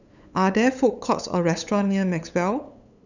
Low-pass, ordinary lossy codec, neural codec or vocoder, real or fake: 7.2 kHz; none; codec, 16 kHz, 8 kbps, FunCodec, trained on LibriTTS, 25 frames a second; fake